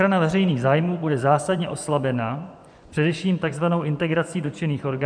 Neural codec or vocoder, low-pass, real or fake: vocoder, 24 kHz, 100 mel bands, Vocos; 9.9 kHz; fake